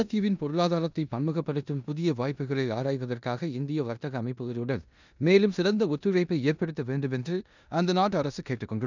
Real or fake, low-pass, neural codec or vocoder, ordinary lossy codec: fake; 7.2 kHz; codec, 16 kHz in and 24 kHz out, 0.9 kbps, LongCat-Audio-Codec, four codebook decoder; none